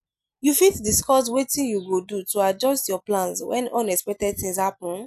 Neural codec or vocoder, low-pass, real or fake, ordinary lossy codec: none; 14.4 kHz; real; none